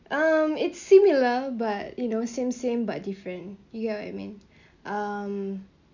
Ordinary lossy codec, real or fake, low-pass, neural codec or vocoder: none; real; 7.2 kHz; none